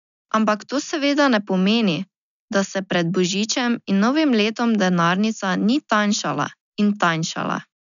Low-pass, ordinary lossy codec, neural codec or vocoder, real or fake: 7.2 kHz; none; none; real